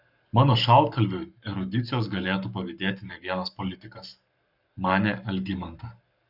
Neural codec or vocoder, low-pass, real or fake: codec, 44.1 kHz, 7.8 kbps, Pupu-Codec; 5.4 kHz; fake